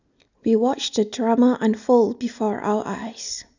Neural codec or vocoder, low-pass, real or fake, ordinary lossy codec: none; 7.2 kHz; real; none